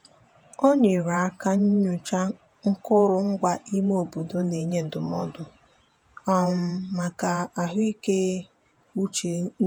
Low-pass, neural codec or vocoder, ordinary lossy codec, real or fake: none; vocoder, 48 kHz, 128 mel bands, Vocos; none; fake